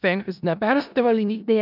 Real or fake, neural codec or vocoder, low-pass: fake; codec, 16 kHz in and 24 kHz out, 0.4 kbps, LongCat-Audio-Codec, four codebook decoder; 5.4 kHz